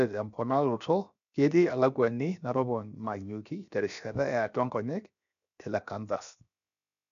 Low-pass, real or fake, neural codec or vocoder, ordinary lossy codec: 7.2 kHz; fake; codec, 16 kHz, 0.7 kbps, FocalCodec; none